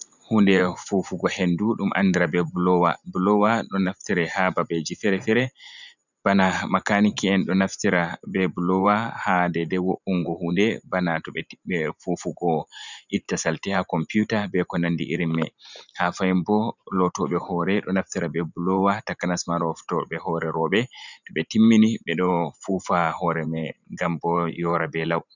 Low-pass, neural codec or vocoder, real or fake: 7.2 kHz; vocoder, 44.1 kHz, 128 mel bands every 512 samples, BigVGAN v2; fake